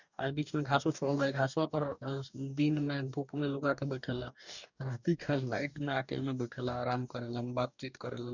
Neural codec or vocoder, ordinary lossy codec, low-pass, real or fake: codec, 44.1 kHz, 2.6 kbps, DAC; none; 7.2 kHz; fake